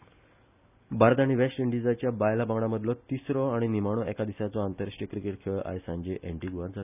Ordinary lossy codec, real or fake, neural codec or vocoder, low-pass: none; real; none; 3.6 kHz